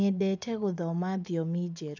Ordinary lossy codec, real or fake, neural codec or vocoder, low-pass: none; fake; vocoder, 24 kHz, 100 mel bands, Vocos; 7.2 kHz